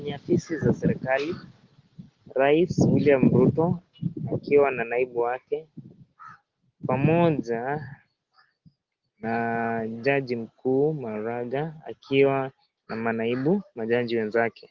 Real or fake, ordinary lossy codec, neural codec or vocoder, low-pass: real; Opus, 16 kbps; none; 7.2 kHz